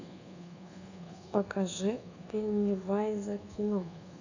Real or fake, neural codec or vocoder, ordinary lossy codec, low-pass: fake; codec, 24 kHz, 1.2 kbps, DualCodec; none; 7.2 kHz